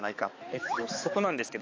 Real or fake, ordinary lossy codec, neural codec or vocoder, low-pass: fake; AAC, 32 kbps; codec, 16 kHz, 4 kbps, X-Codec, HuBERT features, trained on balanced general audio; 7.2 kHz